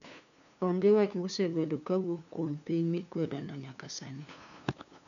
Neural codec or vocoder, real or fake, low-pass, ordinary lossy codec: codec, 16 kHz, 2 kbps, FunCodec, trained on LibriTTS, 25 frames a second; fake; 7.2 kHz; none